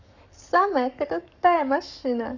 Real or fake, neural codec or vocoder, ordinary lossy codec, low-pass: fake; codec, 44.1 kHz, 7.8 kbps, Pupu-Codec; none; 7.2 kHz